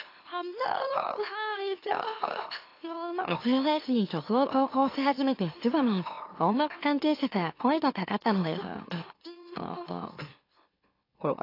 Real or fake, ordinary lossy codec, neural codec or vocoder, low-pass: fake; AAC, 32 kbps; autoencoder, 44.1 kHz, a latent of 192 numbers a frame, MeloTTS; 5.4 kHz